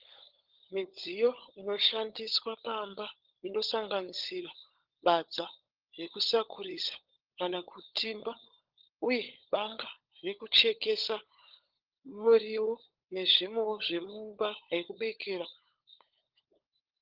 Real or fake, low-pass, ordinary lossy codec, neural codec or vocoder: fake; 5.4 kHz; Opus, 16 kbps; codec, 16 kHz, 16 kbps, FunCodec, trained on LibriTTS, 50 frames a second